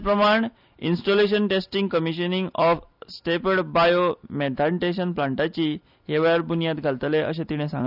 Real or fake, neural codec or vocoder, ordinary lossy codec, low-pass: real; none; none; 5.4 kHz